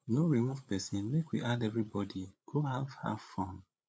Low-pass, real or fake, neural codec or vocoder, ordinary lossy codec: none; fake; codec, 16 kHz, 8 kbps, FreqCodec, larger model; none